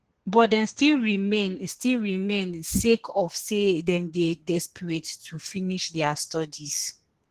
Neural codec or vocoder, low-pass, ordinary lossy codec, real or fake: codec, 32 kHz, 1.9 kbps, SNAC; 14.4 kHz; Opus, 16 kbps; fake